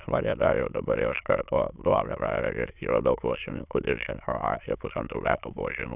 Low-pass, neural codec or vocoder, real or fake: 3.6 kHz; autoencoder, 22.05 kHz, a latent of 192 numbers a frame, VITS, trained on many speakers; fake